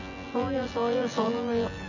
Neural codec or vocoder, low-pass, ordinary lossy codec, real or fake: vocoder, 24 kHz, 100 mel bands, Vocos; 7.2 kHz; none; fake